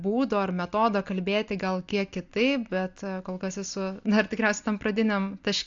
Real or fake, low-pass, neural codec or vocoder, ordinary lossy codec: real; 7.2 kHz; none; AAC, 64 kbps